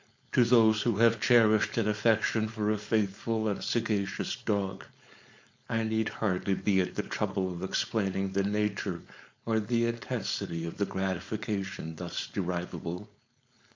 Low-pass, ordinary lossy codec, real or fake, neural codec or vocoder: 7.2 kHz; MP3, 48 kbps; fake; codec, 16 kHz, 4.8 kbps, FACodec